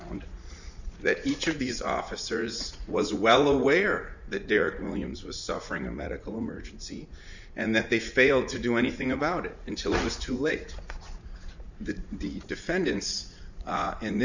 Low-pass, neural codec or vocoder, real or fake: 7.2 kHz; vocoder, 44.1 kHz, 80 mel bands, Vocos; fake